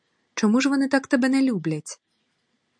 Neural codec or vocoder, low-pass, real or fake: none; 9.9 kHz; real